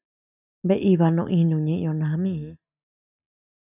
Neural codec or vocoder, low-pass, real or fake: none; 3.6 kHz; real